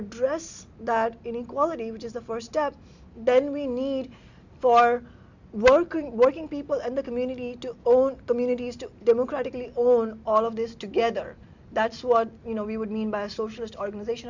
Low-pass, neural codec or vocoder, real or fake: 7.2 kHz; none; real